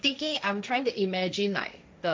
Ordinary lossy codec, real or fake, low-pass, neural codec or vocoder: none; fake; none; codec, 16 kHz, 1.1 kbps, Voila-Tokenizer